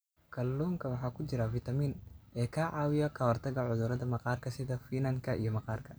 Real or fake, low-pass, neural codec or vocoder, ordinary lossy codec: real; none; none; none